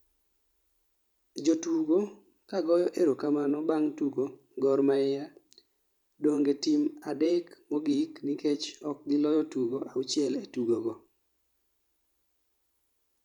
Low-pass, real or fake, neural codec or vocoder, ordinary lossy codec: 19.8 kHz; fake; vocoder, 44.1 kHz, 128 mel bands every 512 samples, BigVGAN v2; none